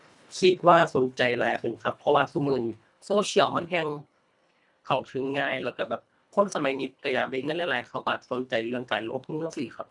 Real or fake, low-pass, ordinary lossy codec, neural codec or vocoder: fake; 10.8 kHz; none; codec, 24 kHz, 1.5 kbps, HILCodec